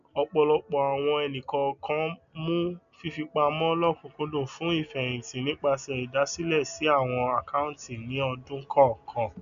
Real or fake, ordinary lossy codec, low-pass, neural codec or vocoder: real; none; 7.2 kHz; none